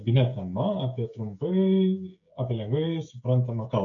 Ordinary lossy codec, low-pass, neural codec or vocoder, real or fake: MP3, 96 kbps; 7.2 kHz; codec, 16 kHz, 16 kbps, FreqCodec, smaller model; fake